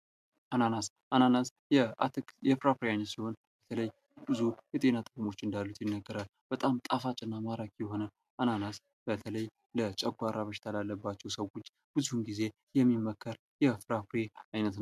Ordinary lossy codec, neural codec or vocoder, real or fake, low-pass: MP3, 96 kbps; none; real; 14.4 kHz